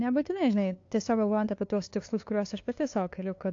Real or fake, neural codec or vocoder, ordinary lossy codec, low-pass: fake; codec, 16 kHz, 2 kbps, FunCodec, trained on LibriTTS, 25 frames a second; AAC, 48 kbps; 7.2 kHz